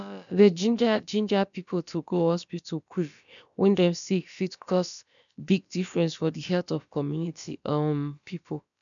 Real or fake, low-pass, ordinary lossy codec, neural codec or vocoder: fake; 7.2 kHz; none; codec, 16 kHz, about 1 kbps, DyCAST, with the encoder's durations